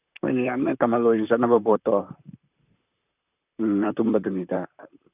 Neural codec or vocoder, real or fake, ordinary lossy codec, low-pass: vocoder, 44.1 kHz, 128 mel bands, Pupu-Vocoder; fake; none; 3.6 kHz